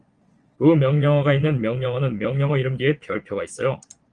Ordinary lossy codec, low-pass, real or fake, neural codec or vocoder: Opus, 24 kbps; 9.9 kHz; fake; vocoder, 22.05 kHz, 80 mel bands, Vocos